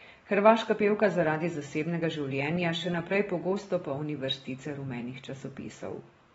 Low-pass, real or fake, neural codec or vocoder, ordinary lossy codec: 14.4 kHz; real; none; AAC, 24 kbps